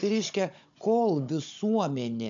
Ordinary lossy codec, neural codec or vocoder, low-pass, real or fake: MP3, 64 kbps; codec, 16 kHz, 16 kbps, FunCodec, trained on Chinese and English, 50 frames a second; 7.2 kHz; fake